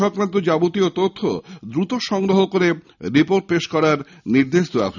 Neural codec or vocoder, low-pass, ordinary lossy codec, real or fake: none; 7.2 kHz; none; real